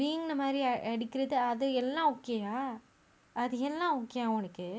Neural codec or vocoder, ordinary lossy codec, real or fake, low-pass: none; none; real; none